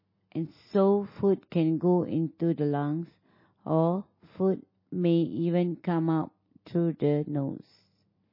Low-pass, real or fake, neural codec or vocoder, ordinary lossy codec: 5.4 kHz; real; none; MP3, 24 kbps